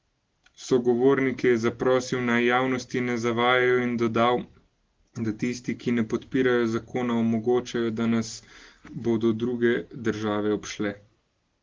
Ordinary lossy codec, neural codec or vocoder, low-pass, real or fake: Opus, 16 kbps; none; 7.2 kHz; real